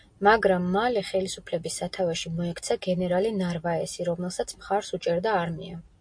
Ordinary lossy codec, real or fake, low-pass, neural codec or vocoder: MP3, 48 kbps; real; 9.9 kHz; none